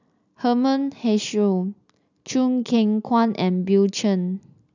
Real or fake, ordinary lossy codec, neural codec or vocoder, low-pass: real; none; none; 7.2 kHz